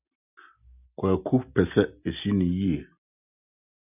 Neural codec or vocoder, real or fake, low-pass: none; real; 3.6 kHz